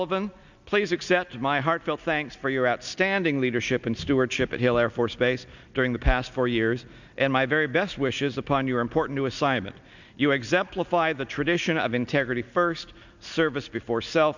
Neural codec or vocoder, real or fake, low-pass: none; real; 7.2 kHz